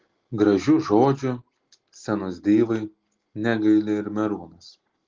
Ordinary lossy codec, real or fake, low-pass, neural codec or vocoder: Opus, 16 kbps; real; 7.2 kHz; none